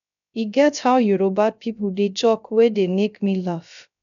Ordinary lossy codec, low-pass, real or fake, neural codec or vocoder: none; 7.2 kHz; fake; codec, 16 kHz, 0.3 kbps, FocalCodec